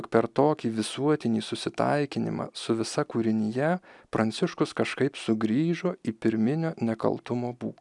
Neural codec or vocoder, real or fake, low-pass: none; real; 10.8 kHz